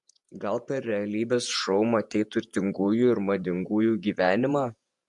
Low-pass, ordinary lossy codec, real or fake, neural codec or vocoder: 10.8 kHz; MP3, 64 kbps; fake; vocoder, 48 kHz, 128 mel bands, Vocos